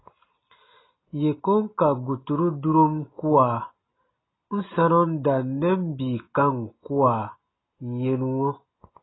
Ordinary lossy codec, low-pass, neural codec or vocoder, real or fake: AAC, 16 kbps; 7.2 kHz; none; real